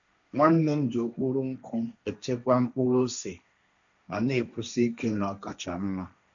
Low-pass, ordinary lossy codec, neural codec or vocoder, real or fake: 7.2 kHz; none; codec, 16 kHz, 1.1 kbps, Voila-Tokenizer; fake